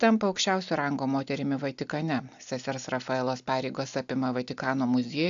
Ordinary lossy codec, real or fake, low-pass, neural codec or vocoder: AAC, 64 kbps; real; 7.2 kHz; none